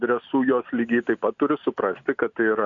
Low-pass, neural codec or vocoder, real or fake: 7.2 kHz; none; real